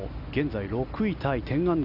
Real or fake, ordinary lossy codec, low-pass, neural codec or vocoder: real; none; 5.4 kHz; none